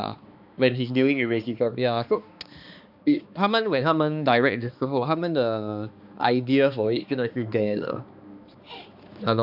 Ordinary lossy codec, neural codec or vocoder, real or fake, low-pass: AAC, 48 kbps; codec, 16 kHz, 2 kbps, X-Codec, HuBERT features, trained on balanced general audio; fake; 5.4 kHz